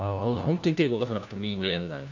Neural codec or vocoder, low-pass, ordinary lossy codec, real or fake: codec, 16 kHz, 1 kbps, FunCodec, trained on LibriTTS, 50 frames a second; 7.2 kHz; none; fake